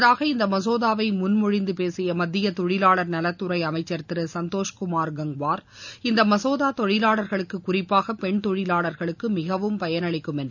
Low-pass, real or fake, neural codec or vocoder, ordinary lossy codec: 7.2 kHz; real; none; none